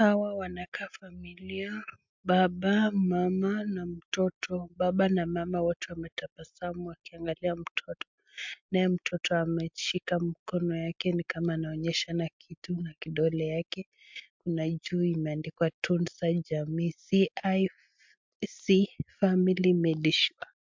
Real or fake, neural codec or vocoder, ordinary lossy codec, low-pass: real; none; MP3, 64 kbps; 7.2 kHz